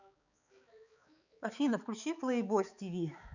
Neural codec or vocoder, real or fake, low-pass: codec, 16 kHz, 4 kbps, X-Codec, HuBERT features, trained on balanced general audio; fake; 7.2 kHz